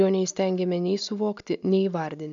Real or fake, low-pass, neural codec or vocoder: real; 7.2 kHz; none